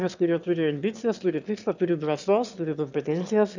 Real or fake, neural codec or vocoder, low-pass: fake; autoencoder, 22.05 kHz, a latent of 192 numbers a frame, VITS, trained on one speaker; 7.2 kHz